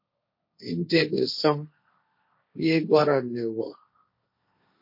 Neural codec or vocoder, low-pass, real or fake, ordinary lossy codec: codec, 16 kHz, 1.1 kbps, Voila-Tokenizer; 5.4 kHz; fake; MP3, 32 kbps